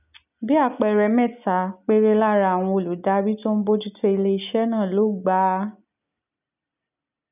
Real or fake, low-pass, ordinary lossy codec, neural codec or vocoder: real; 3.6 kHz; none; none